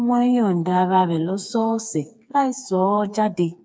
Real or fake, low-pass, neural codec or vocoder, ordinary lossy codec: fake; none; codec, 16 kHz, 4 kbps, FreqCodec, smaller model; none